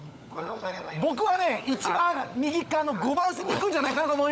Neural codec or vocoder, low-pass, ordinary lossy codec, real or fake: codec, 16 kHz, 16 kbps, FunCodec, trained on LibriTTS, 50 frames a second; none; none; fake